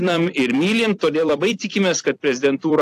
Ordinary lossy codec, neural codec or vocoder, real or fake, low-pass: AAC, 64 kbps; vocoder, 44.1 kHz, 128 mel bands every 256 samples, BigVGAN v2; fake; 14.4 kHz